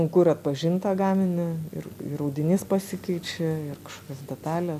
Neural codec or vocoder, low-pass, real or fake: none; 14.4 kHz; real